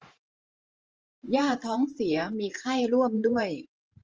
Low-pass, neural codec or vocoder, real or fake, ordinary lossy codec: 7.2 kHz; vocoder, 44.1 kHz, 128 mel bands every 512 samples, BigVGAN v2; fake; Opus, 24 kbps